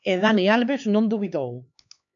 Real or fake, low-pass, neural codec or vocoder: fake; 7.2 kHz; codec, 16 kHz, 4 kbps, X-Codec, HuBERT features, trained on LibriSpeech